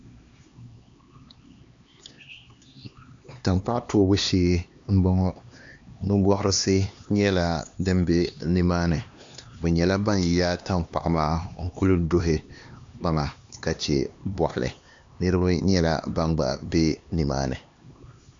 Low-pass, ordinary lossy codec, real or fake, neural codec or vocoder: 7.2 kHz; AAC, 64 kbps; fake; codec, 16 kHz, 2 kbps, X-Codec, HuBERT features, trained on LibriSpeech